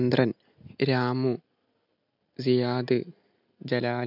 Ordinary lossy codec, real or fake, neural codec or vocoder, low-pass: none; real; none; 5.4 kHz